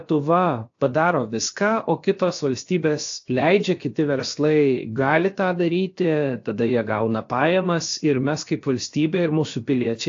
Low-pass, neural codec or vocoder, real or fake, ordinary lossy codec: 7.2 kHz; codec, 16 kHz, about 1 kbps, DyCAST, with the encoder's durations; fake; AAC, 48 kbps